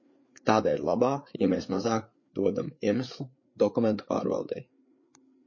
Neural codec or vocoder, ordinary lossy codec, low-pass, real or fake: codec, 16 kHz, 4 kbps, FreqCodec, larger model; MP3, 32 kbps; 7.2 kHz; fake